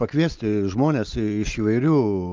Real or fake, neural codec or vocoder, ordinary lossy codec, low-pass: real; none; Opus, 24 kbps; 7.2 kHz